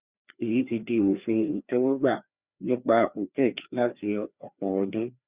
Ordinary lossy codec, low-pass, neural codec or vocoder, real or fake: Opus, 64 kbps; 3.6 kHz; codec, 16 kHz, 2 kbps, FreqCodec, larger model; fake